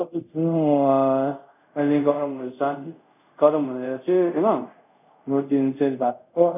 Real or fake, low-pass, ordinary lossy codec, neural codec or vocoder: fake; 3.6 kHz; none; codec, 24 kHz, 0.5 kbps, DualCodec